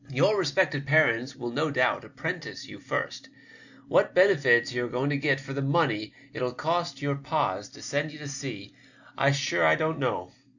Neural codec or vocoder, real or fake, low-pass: none; real; 7.2 kHz